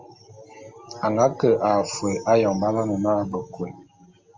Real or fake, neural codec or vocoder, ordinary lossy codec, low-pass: real; none; Opus, 32 kbps; 7.2 kHz